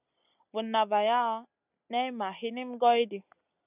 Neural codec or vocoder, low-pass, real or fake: none; 3.6 kHz; real